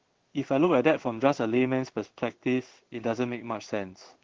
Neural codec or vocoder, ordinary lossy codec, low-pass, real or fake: codec, 16 kHz in and 24 kHz out, 1 kbps, XY-Tokenizer; Opus, 16 kbps; 7.2 kHz; fake